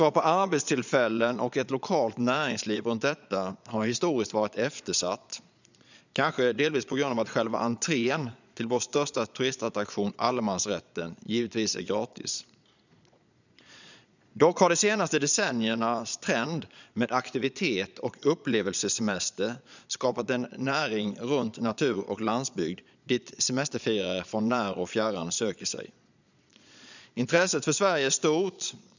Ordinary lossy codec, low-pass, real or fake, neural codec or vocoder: none; 7.2 kHz; fake; vocoder, 22.05 kHz, 80 mel bands, Vocos